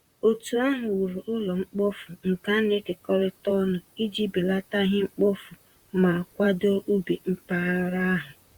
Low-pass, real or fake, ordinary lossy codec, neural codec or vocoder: 19.8 kHz; fake; Opus, 64 kbps; vocoder, 44.1 kHz, 128 mel bands, Pupu-Vocoder